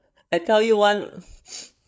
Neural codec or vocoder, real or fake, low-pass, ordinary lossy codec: codec, 16 kHz, 8 kbps, FreqCodec, larger model; fake; none; none